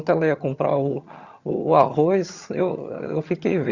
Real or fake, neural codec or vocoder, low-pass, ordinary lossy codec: fake; vocoder, 22.05 kHz, 80 mel bands, HiFi-GAN; 7.2 kHz; Opus, 64 kbps